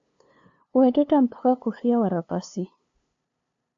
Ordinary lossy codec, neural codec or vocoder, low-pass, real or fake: AAC, 48 kbps; codec, 16 kHz, 2 kbps, FunCodec, trained on LibriTTS, 25 frames a second; 7.2 kHz; fake